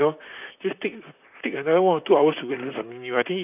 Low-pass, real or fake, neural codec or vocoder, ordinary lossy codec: 3.6 kHz; fake; vocoder, 44.1 kHz, 128 mel bands, Pupu-Vocoder; none